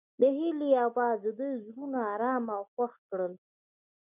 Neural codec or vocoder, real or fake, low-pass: none; real; 3.6 kHz